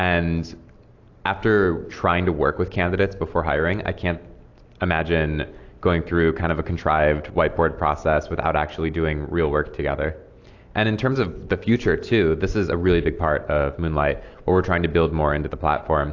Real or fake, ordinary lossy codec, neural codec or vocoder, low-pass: real; AAC, 48 kbps; none; 7.2 kHz